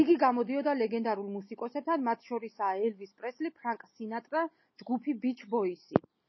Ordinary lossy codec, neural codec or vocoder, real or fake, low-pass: MP3, 24 kbps; none; real; 7.2 kHz